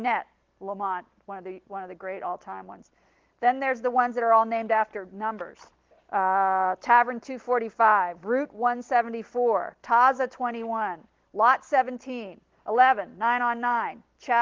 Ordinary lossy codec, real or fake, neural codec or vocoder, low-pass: Opus, 16 kbps; real; none; 7.2 kHz